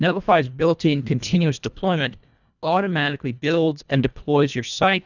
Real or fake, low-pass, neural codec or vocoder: fake; 7.2 kHz; codec, 24 kHz, 1.5 kbps, HILCodec